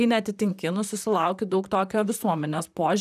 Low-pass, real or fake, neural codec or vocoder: 14.4 kHz; fake; vocoder, 44.1 kHz, 128 mel bands, Pupu-Vocoder